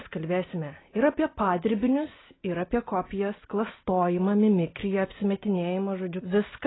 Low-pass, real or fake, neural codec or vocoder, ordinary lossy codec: 7.2 kHz; real; none; AAC, 16 kbps